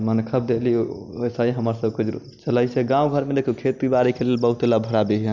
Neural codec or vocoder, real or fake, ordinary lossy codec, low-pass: none; real; none; 7.2 kHz